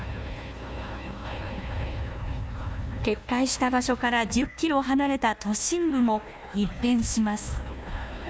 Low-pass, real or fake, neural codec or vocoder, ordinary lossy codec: none; fake; codec, 16 kHz, 1 kbps, FunCodec, trained on Chinese and English, 50 frames a second; none